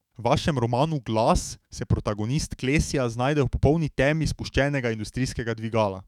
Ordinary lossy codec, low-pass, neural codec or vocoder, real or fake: none; 19.8 kHz; autoencoder, 48 kHz, 128 numbers a frame, DAC-VAE, trained on Japanese speech; fake